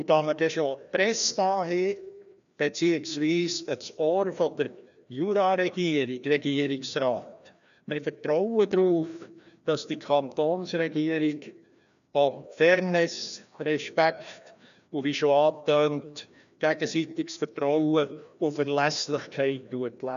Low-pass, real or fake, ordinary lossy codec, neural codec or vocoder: 7.2 kHz; fake; none; codec, 16 kHz, 1 kbps, FreqCodec, larger model